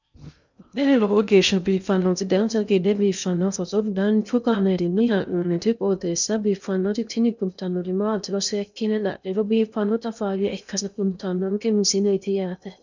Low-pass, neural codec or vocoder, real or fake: 7.2 kHz; codec, 16 kHz in and 24 kHz out, 0.6 kbps, FocalCodec, streaming, 2048 codes; fake